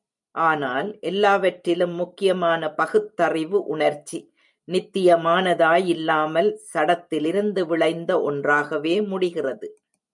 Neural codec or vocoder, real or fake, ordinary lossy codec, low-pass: vocoder, 44.1 kHz, 128 mel bands every 256 samples, BigVGAN v2; fake; MP3, 96 kbps; 10.8 kHz